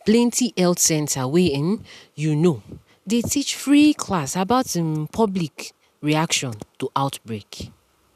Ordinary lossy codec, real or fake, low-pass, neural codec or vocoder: none; real; 14.4 kHz; none